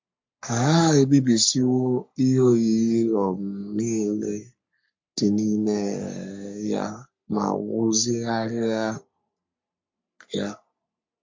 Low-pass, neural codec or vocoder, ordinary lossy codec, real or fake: 7.2 kHz; codec, 44.1 kHz, 3.4 kbps, Pupu-Codec; MP3, 48 kbps; fake